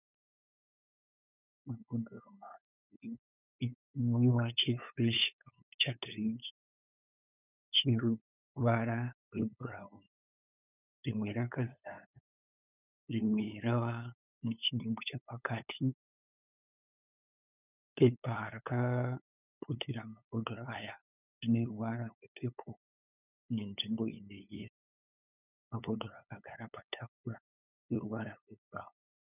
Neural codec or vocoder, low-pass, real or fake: codec, 16 kHz, 8 kbps, FunCodec, trained on LibriTTS, 25 frames a second; 3.6 kHz; fake